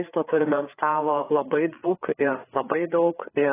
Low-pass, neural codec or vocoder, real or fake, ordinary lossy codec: 3.6 kHz; codec, 16 kHz, 4 kbps, FreqCodec, larger model; fake; AAC, 16 kbps